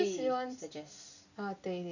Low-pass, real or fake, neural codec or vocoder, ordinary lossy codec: 7.2 kHz; real; none; none